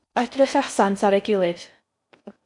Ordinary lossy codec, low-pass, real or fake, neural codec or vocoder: MP3, 96 kbps; 10.8 kHz; fake; codec, 16 kHz in and 24 kHz out, 0.6 kbps, FocalCodec, streaming, 4096 codes